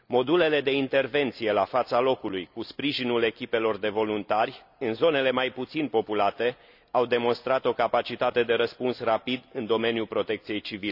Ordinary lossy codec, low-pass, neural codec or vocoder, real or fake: none; 5.4 kHz; none; real